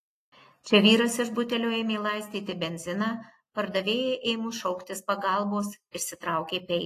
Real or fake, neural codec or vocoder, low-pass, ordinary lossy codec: real; none; 14.4 kHz; AAC, 48 kbps